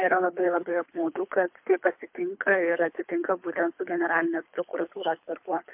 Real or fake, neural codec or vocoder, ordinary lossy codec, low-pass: fake; codec, 24 kHz, 3 kbps, HILCodec; MP3, 32 kbps; 3.6 kHz